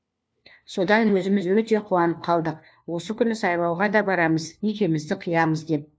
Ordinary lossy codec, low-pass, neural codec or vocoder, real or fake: none; none; codec, 16 kHz, 1 kbps, FunCodec, trained on LibriTTS, 50 frames a second; fake